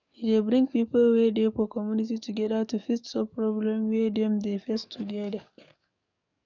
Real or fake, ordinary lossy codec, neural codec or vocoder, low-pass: fake; none; codec, 44.1 kHz, 7.8 kbps, DAC; 7.2 kHz